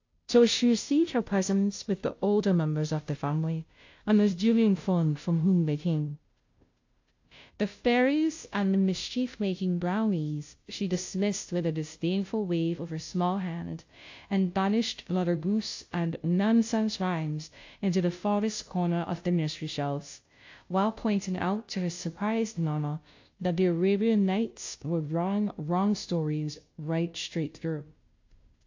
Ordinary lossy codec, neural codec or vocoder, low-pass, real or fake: AAC, 48 kbps; codec, 16 kHz, 0.5 kbps, FunCodec, trained on Chinese and English, 25 frames a second; 7.2 kHz; fake